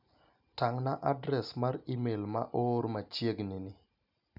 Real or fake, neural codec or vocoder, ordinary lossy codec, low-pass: real; none; none; 5.4 kHz